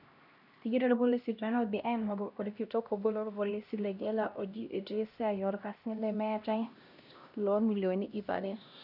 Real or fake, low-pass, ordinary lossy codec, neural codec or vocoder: fake; 5.4 kHz; none; codec, 16 kHz, 1 kbps, X-Codec, HuBERT features, trained on LibriSpeech